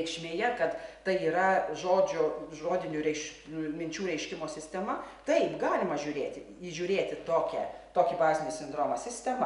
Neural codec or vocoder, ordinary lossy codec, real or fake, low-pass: none; MP3, 96 kbps; real; 10.8 kHz